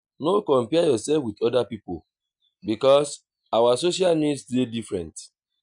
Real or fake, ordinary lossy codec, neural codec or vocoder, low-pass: real; none; none; 9.9 kHz